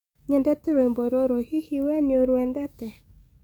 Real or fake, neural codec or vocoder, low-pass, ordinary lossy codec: fake; codec, 44.1 kHz, 7.8 kbps, DAC; 19.8 kHz; none